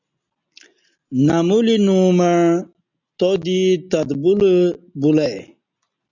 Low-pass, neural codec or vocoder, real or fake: 7.2 kHz; none; real